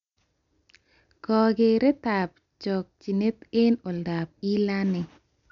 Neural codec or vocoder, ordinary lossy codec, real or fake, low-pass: none; MP3, 96 kbps; real; 7.2 kHz